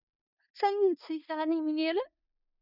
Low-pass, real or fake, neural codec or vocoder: 5.4 kHz; fake; codec, 16 kHz in and 24 kHz out, 0.4 kbps, LongCat-Audio-Codec, four codebook decoder